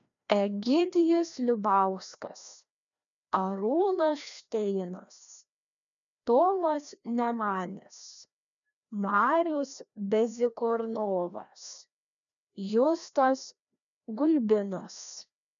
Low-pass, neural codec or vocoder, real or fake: 7.2 kHz; codec, 16 kHz, 1 kbps, FreqCodec, larger model; fake